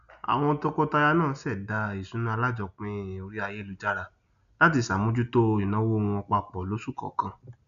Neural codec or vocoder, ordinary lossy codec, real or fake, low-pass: none; none; real; 7.2 kHz